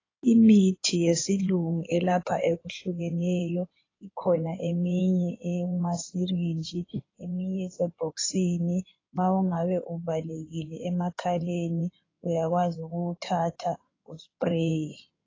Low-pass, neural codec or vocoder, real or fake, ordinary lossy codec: 7.2 kHz; codec, 16 kHz in and 24 kHz out, 2.2 kbps, FireRedTTS-2 codec; fake; AAC, 32 kbps